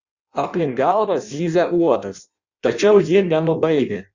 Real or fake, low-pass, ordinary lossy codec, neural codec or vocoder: fake; 7.2 kHz; Opus, 64 kbps; codec, 16 kHz in and 24 kHz out, 0.6 kbps, FireRedTTS-2 codec